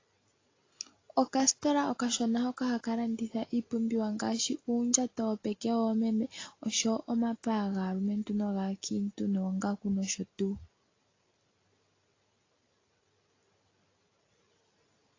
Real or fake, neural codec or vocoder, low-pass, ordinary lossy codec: real; none; 7.2 kHz; AAC, 32 kbps